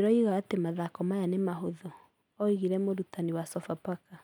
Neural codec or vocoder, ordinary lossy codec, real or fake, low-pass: none; none; real; 19.8 kHz